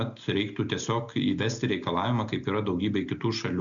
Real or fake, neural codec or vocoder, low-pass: real; none; 7.2 kHz